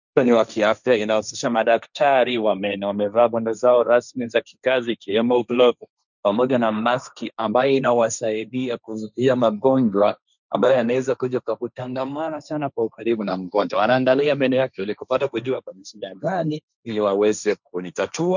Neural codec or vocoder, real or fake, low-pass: codec, 16 kHz, 1.1 kbps, Voila-Tokenizer; fake; 7.2 kHz